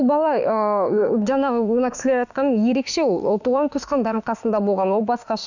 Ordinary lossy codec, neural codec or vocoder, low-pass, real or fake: none; autoencoder, 48 kHz, 32 numbers a frame, DAC-VAE, trained on Japanese speech; 7.2 kHz; fake